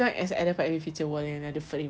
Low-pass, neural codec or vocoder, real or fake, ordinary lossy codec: none; none; real; none